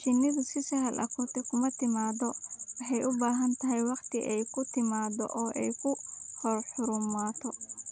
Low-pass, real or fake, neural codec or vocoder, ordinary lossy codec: none; real; none; none